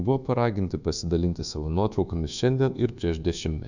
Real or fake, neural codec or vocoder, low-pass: fake; codec, 24 kHz, 1.2 kbps, DualCodec; 7.2 kHz